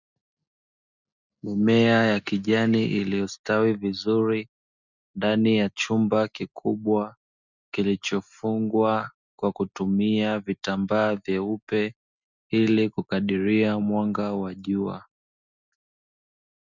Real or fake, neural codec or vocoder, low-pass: real; none; 7.2 kHz